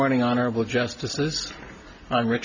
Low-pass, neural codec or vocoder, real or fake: 7.2 kHz; none; real